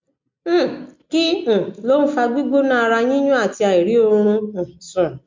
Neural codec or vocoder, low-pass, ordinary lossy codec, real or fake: none; 7.2 kHz; MP3, 48 kbps; real